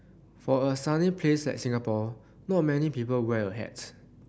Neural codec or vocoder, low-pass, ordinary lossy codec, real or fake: none; none; none; real